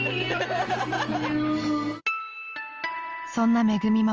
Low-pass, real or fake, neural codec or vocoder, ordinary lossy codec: 7.2 kHz; real; none; Opus, 24 kbps